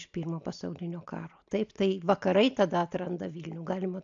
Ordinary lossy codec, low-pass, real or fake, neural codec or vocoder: AAC, 64 kbps; 7.2 kHz; real; none